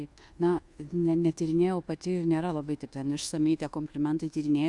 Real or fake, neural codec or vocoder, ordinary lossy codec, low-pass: fake; codec, 24 kHz, 1.2 kbps, DualCodec; Opus, 64 kbps; 10.8 kHz